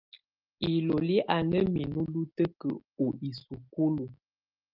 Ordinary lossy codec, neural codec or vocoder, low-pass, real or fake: Opus, 32 kbps; none; 5.4 kHz; real